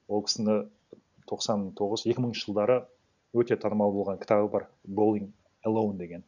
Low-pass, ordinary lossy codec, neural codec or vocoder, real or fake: 7.2 kHz; none; none; real